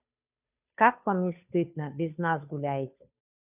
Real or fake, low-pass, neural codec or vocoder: fake; 3.6 kHz; codec, 16 kHz, 2 kbps, FunCodec, trained on Chinese and English, 25 frames a second